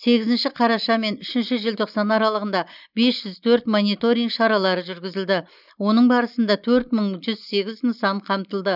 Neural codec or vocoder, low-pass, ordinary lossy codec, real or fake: none; 5.4 kHz; none; real